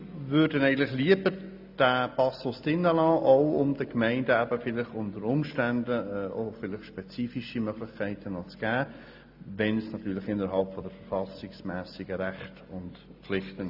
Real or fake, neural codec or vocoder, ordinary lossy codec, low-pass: real; none; AAC, 48 kbps; 5.4 kHz